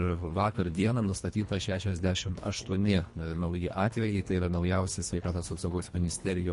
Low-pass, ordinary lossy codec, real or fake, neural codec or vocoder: 10.8 kHz; MP3, 48 kbps; fake; codec, 24 kHz, 1.5 kbps, HILCodec